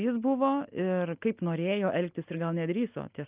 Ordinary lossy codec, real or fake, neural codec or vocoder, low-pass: Opus, 24 kbps; real; none; 3.6 kHz